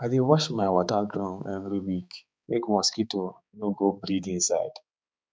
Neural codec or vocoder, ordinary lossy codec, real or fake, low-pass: codec, 16 kHz, 4 kbps, X-Codec, HuBERT features, trained on balanced general audio; none; fake; none